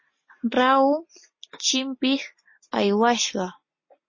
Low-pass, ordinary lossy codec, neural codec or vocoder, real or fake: 7.2 kHz; MP3, 32 kbps; none; real